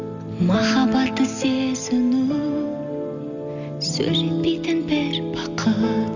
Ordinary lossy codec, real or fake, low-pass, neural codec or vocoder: none; real; 7.2 kHz; none